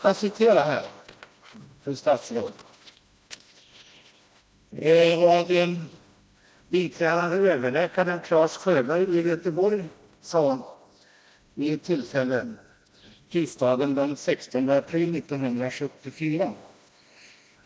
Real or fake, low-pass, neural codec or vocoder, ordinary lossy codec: fake; none; codec, 16 kHz, 1 kbps, FreqCodec, smaller model; none